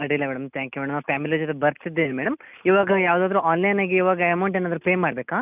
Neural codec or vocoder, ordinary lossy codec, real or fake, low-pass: none; none; real; 3.6 kHz